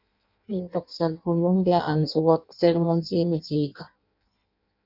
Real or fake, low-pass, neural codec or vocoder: fake; 5.4 kHz; codec, 16 kHz in and 24 kHz out, 0.6 kbps, FireRedTTS-2 codec